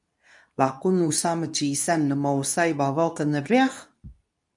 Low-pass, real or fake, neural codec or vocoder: 10.8 kHz; fake; codec, 24 kHz, 0.9 kbps, WavTokenizer, medium speech release version 2